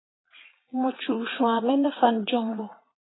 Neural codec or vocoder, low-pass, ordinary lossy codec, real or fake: vocoder, 22.05 kHz, 80 mel bands, Vocos; 7.2 kHz; AAC, 16 kbps; fake